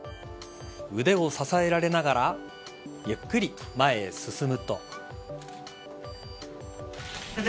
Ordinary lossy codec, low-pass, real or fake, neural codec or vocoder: none; none; real; none